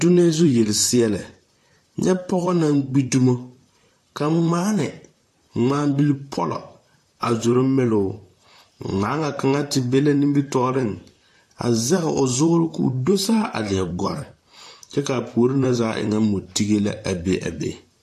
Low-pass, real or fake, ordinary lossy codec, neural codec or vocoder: 14.4 kHz; fake; AAC, 64 kbps; vocoder, 44.1 kHz, 128 mel bands, Pupu-Vocoder